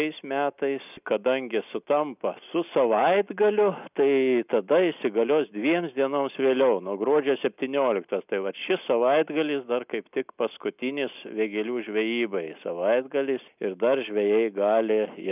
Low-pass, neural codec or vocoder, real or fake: 3.6 kHz; none; real